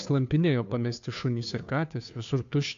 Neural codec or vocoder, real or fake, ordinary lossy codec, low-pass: codec, 16 kHz, 2 kbps, FunCodec, trained on Chinese and English, 25 frames a second; fake; AAC, 64 kbps; 7.2 kHz